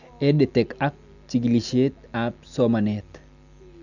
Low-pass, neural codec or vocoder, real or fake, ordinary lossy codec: 7.2 kHz; none; real; none